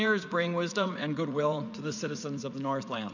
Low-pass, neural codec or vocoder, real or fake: 7.2 kHz; vocoder, 44.1 kHz, 80 mel bands, Vocos; fake